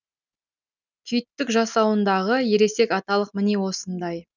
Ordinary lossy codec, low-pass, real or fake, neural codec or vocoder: none; 7.2 kHz; real; none